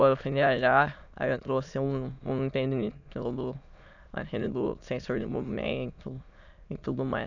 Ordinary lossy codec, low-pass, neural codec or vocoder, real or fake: none; 7.2 kHz; autoencoder, 22.05 kHz, a latent of 192 numbers a frame, VITS, trained on many speakers; fake